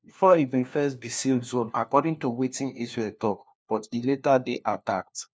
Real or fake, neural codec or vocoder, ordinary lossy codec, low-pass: fake; codec, 16 kHz, 1 kbps, FunCodec, trained on LibriTTS, 50 frames a second; none; none